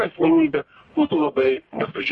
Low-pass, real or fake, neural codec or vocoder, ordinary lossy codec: 10.8 kHz; fake; codec, 44.1 kHz, 1.7 kbps, Pupu-Codec; MP3, 48 kbps